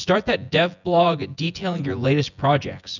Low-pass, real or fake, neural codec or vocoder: 7.2 kHz; fake; vocoder, 24 kHz, 100 mel bands, Vocos